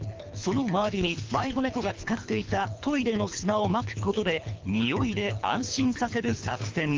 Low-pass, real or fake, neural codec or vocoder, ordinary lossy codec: 7.2 kHz; fake; codec, 24 kHz, 3 kbps, HILCodec; Opus, 24 kbps